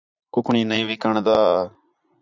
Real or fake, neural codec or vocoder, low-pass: fake; vocoder, 44.1 kHz, 80 mel bands, Vocos; 7.2 kHz